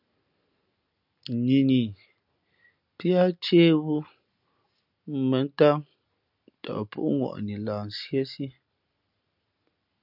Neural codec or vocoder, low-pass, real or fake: none; 5.4 kHz; real